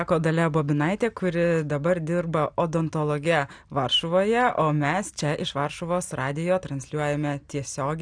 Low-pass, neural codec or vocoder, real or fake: 9.9 kHz; none; real